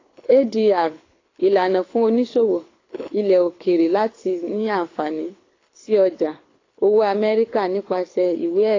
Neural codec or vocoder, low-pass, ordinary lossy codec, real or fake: codec, 24 kHz, 6 kbps, HILCodec; 7.2 kHz; AAC, 32 kbps; fake